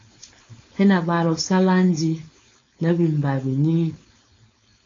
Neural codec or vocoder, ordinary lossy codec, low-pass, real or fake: codec, 16 kHz, 4.8 kbps, FACodec; AAC, 32 kbps; 7.2 kHz; fake